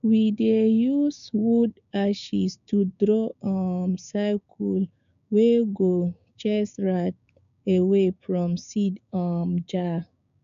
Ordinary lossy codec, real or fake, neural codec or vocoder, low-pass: none; fake; codec, 16 kHz, 6 kbps, DAC; 7.2 kHz